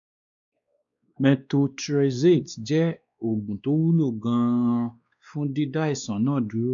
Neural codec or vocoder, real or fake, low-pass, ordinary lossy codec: codec, 16 kHz, 2 kbps, X-Codec, WavLM features, trained on Multilingual LibriSpeech; fake; 7.2 kHz; none